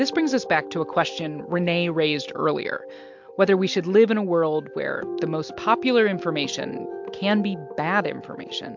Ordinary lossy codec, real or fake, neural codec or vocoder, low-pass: MP3, 64 kbps; real; none; 7.2 kHz